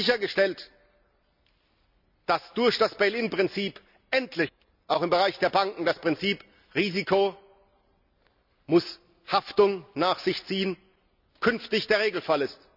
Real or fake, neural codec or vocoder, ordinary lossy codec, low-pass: real; none; none; 5.4 kHz